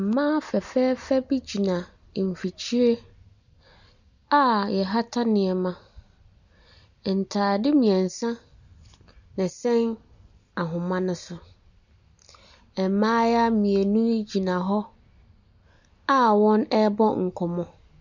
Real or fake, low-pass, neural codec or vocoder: real; 7.2 kHz; none